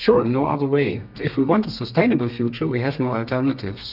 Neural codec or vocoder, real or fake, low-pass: codec, 32 kHz, 1.9 kbps, SNAC; fake; 5.4 kHz